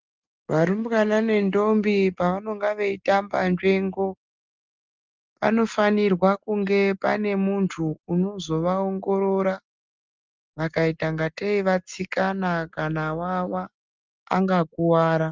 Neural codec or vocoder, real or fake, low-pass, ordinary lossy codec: none; real; 7.2 kHz; Opus, 32 kbps